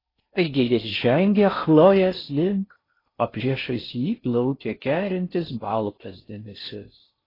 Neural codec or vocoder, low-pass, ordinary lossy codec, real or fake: codec, 16 kHz in and 24 kHz out, 0.6 kbps, FocalCodec, streaming, 4096 codes; 5.4 kHz; AAC, 24 kbps; fake